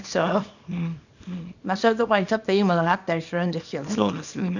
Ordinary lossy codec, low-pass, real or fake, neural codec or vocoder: none; 7.2 kHz; fake; codec, 24 kHz, 0.9 kbps, WavTokenizer, small release